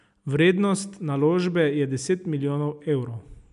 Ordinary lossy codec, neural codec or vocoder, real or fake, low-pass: none; none; real; 10.8 kHz